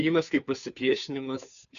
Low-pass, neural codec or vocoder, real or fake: 7.2 kHz; codec, 16 kHz, 2 kbps, FunCodec, trained on Chinese and English, 25 frames a second; fake